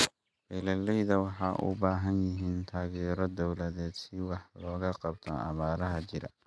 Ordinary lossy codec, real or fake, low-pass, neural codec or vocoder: none; real; none; none